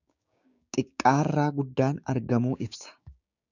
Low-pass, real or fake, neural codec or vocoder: 7.2 kHz; fake; codec, 16 kHz, 6 kbps, DAC